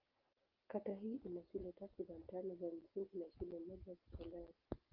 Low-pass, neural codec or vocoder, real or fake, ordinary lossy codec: 5.4 kHz; none; real; Opus, 32 kbps